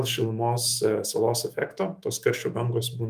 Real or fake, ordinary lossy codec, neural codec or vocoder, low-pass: fake; Opus, 32 kbps; autoencoder, 48 kHz, 128 numbers a frame, DAC-VAE, trained on Japanese speech; 14.4 kHz